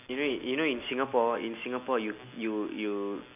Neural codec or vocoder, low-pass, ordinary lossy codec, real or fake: none; 3.6 kHz; none; real